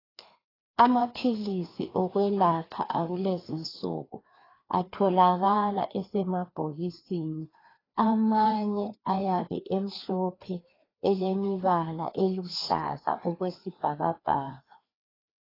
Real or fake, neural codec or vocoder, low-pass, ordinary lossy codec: fake; codec, 16 kHz, 2 kbps, FreqCodec, larger model; 5.4 kHz; AAC, 24 kbps